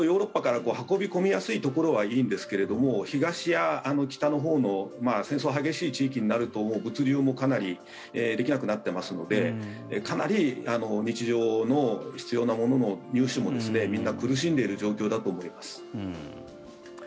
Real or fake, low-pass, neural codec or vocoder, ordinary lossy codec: real; none; none; none